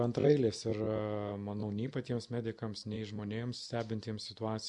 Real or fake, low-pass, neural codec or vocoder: real; 10.8 kHz; none